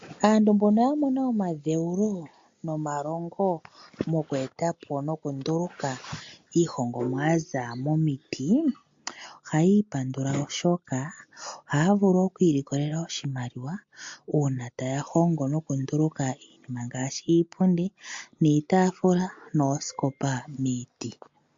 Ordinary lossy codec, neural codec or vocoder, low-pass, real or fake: MP3, 48 kbps; none; 7.2 kHz; real